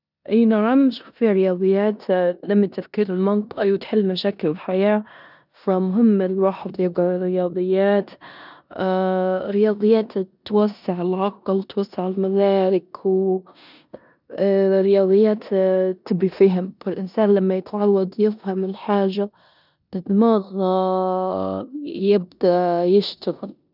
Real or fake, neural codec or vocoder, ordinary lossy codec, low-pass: fake; codec, 16 kHz in and 24 kHz out, 0.9 kbps, LongCat-Audio-Codec, four codebook decoder; none; 5.4 kHz